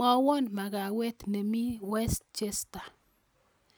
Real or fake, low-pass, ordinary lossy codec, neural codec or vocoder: real; none; none; none